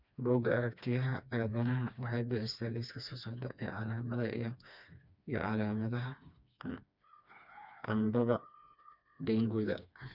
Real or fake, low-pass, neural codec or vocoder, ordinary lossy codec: fake; 5.4 kHz; codec, 16 kHz, 2 kbps, FreqCodec, smaller model; none